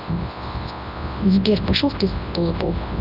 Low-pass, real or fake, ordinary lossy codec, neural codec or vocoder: 5.4 kHz; fake; none; codec, 24 kHz, 0.9 kbps, WavTokenizer, large speech release